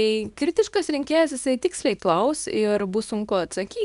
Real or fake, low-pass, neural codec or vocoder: fake; 10.8 kHz; codec, 24 kHz, 0.9 kbps, WavTokenizer, small release